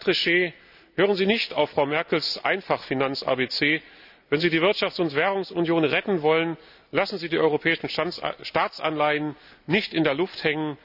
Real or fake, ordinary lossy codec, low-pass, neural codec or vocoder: real; none; 5.4 kHz; none